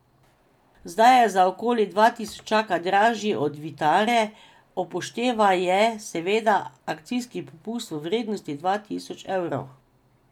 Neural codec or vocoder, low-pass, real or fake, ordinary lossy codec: none; 19.8 kHz; real; none